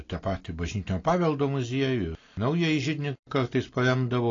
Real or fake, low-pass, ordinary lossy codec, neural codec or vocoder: real; 7.2 kHz; AAC, 32 kbps; none